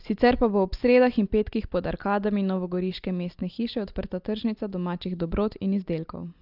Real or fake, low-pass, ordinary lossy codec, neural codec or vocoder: real; 5.4 kHz; Opus, 32 kbps; none